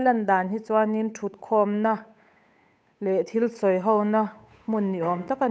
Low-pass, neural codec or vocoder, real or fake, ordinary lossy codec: none; codec, 16 kHz, 8 kbps, FunCodec, trained on Chinese and English, 25 frames a second; fake; none